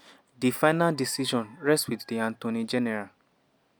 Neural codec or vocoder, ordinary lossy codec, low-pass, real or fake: none; none; none; real